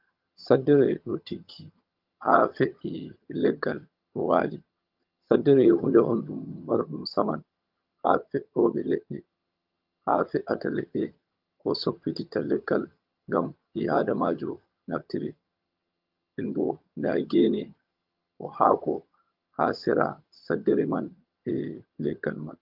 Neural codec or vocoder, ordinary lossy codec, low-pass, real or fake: vocoder, 22.05 kHz, 80 mel bands, HiFi-GAN; Opus, 24 kbps; 5.4 kHz; fake